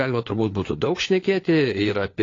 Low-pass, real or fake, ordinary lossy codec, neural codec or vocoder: 7.2 kHz; fake; AAC, 32 kbps; codec, 16 kHz, 4 kbps, FunCodec, trained on LibriTTS, 50 frames a second